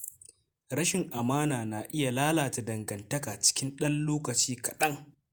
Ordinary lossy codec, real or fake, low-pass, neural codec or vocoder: none; fake; none; vocoder, 48 kHz, 128 mel bands, Vocos